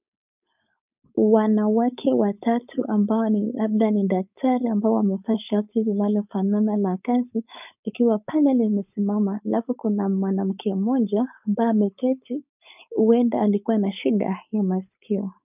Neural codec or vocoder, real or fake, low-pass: codec, 16 kHz, 4.8 kbps, FACodec; fake; 3.6 kHz